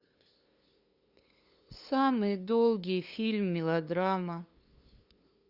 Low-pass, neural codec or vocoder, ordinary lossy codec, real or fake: 5.4 kHz; codec, 16 kHz, 2 kbps, FunCodec, trained on LibriTTS, 25 frames a second; Opus, 64 kbps; fake